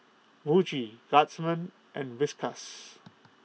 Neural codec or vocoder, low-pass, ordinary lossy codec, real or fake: none; none; none; real